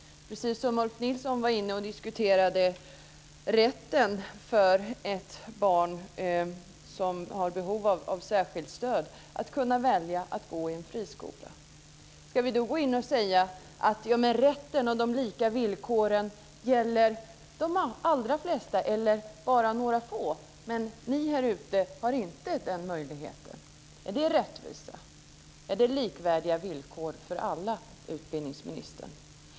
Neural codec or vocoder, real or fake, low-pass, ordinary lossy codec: none; real; none; none